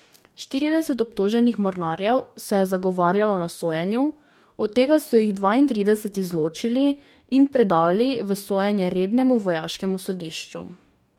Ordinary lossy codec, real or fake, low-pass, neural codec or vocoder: MP3, 96 kbps; fake; 19.8 kHz; codec, 44.1 kHz, 2.6 kbps, DAC